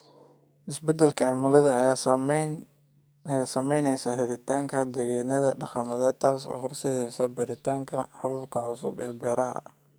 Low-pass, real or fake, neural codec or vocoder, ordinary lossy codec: none; fake; codec, 44.1 kHz, 2.6 kbps, SNAC; none